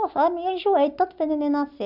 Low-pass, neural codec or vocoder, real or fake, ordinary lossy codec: 5.4 kHz; none; real; none